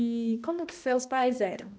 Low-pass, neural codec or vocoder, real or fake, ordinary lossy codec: none; codec, 16 kHz, 1 kbps, X-Codec, HuBERT features, trained on balanced general audio; fake; none